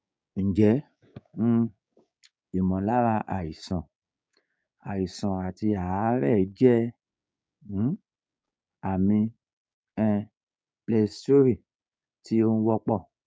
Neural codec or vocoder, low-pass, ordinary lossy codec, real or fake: codec, 16 kHz, 6 kbps, DAC; none; none; fake